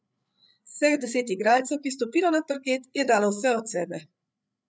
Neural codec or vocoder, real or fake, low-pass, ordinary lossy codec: codec, 16 kHz, 8 kbps, FreqCodec, larger model; fake; none; none